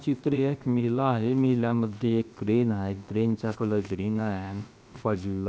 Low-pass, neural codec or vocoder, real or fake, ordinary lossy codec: none; codec, 16 kHz, about 1 kbps, DyCAST, with the encoder's durations; fake; none